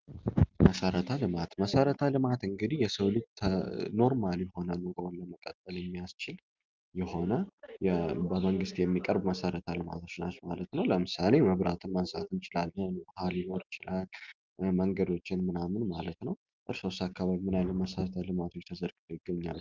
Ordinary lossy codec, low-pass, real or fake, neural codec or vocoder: Opus, 24 kbps; 7.2 kHz; real; none